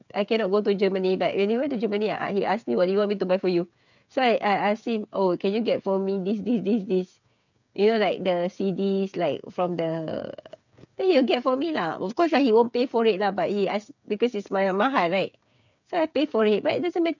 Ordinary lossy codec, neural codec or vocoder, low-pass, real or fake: none; codec, 16 kHz, 8 kbps, FreqCodec, smaller model; 7.2 kHz; fake